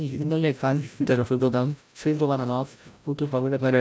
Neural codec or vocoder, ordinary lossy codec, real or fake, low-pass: codec, 16 kHz, 0.5 kbps, FreqCodec, larger model; none; fake; none